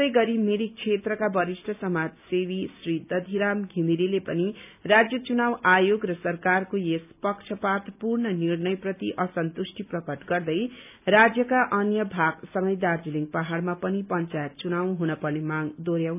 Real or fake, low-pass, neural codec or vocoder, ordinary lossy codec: real; 3.6 kHz; none; none